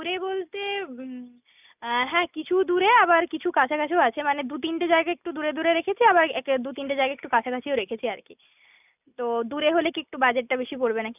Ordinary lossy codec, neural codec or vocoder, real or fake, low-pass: none; none; real; 3.6 kHz